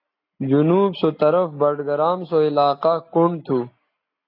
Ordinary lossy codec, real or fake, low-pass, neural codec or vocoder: AAC, 32 kbps; real; 5.4 kHz; none